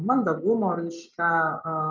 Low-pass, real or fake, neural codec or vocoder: 7.2 kHz; real; none